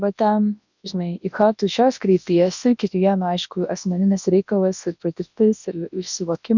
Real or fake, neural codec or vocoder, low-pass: fake; codec, 24 kHz, 0.9 kbps, WavTokenizer, large speech release; 7.2 kHz